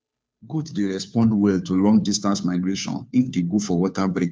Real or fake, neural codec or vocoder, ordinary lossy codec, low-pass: fake; codec, 16 kHz, 2 kbps, FunCodec, trained on Chinese and English, 25 frames a second; none; none